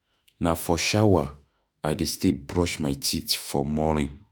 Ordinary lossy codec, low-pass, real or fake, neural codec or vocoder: none; none; fake; autoencoder, 48 kHz, 32 numbers a frame, DAC-VAE, trained on Japanese speech